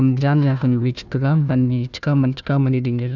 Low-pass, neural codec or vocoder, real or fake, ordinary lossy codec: 7.2 kHz; codec, 16 kHz, 1 kbps, FunCodec, trained on Chinese and English, 50 frames a second; fake; none